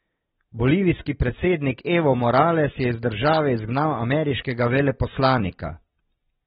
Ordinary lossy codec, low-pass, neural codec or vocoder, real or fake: AAC, 16 kbps; 9.9 kHz; none; real